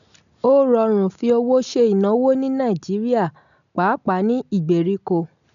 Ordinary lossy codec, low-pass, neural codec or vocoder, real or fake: none; 7.2 kHz; none; real